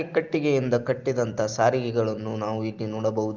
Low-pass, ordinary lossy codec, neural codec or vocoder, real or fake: 7.2 kHz; Opus, 32 kbps; none; real